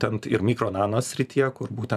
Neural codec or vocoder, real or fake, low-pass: vocoder, 44.1 kHz, 128 mel bands every 512 samples, BigVGAN v2; fake; 14.4 kHz